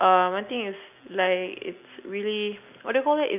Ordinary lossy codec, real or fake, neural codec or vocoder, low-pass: none; real; none; 3.6 kHz